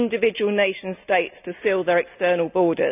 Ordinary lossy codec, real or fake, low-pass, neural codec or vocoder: none; real; 3.6 kHz; none